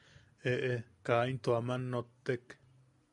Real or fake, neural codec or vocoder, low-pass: real; none; 10.8 kHz